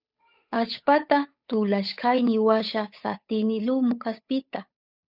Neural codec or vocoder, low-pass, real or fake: codec, 16 kHz, 8 kbps, FunCodec, trained on Chinese and English, 25 frames a second; 5.4 kHz; fake